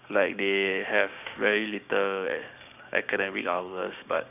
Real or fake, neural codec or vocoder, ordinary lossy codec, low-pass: fake; codec, 16 kHz, 6 kbps, DAC; none; 3.6 kHz